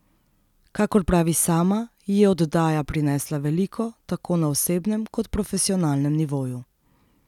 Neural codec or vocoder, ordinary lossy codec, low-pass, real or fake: none; none; 19.8 kHz; real